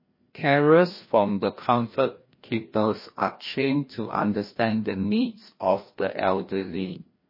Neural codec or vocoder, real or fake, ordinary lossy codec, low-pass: codec, 16 kHz, 1 kbps, FreqCodec, larger model; fake; MP3, 24 kbps; 5.4 kHz